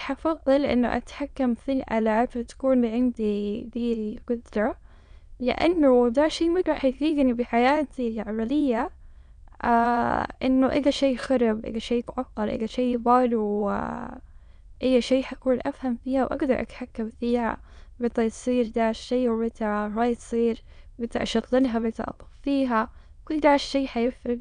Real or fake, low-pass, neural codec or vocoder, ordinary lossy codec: fake; 9.9 kHz; autoencoder, 22.05 kHz, a latent of 192 numbers a frame, VITS, trained on many speakers; none